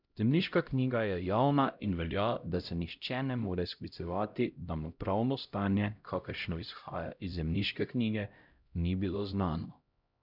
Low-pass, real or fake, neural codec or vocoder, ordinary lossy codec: 5.4 kHz; fake; codec, 16 kHz, 0.5 kbps, X-Codec, HuBERT features, trained on LibriSpeech; none